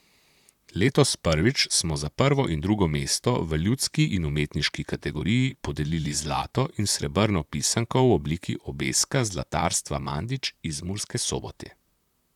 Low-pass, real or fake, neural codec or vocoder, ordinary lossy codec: 19.8 kHz; fake; vocoder, 44.1 kHz, 128 mel bands, Pupu-Vocoder; none